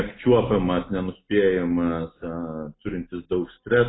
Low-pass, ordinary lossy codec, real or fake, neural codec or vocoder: 7.2 kHz; AAC, 16 kbps; real; none